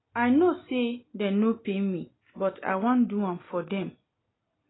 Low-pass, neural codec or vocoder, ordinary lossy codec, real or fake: 7.2 kHz; none; AAC, 16 kbps; real